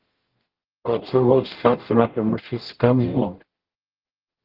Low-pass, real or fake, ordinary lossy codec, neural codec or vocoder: 5.4 kHz; fake; Opus, 16 kbps; codec, 44.1 kHz, 0.9 kbps, DAC